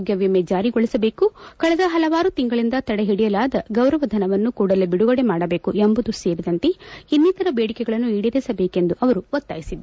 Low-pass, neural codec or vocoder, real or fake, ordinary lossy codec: none; none; real; none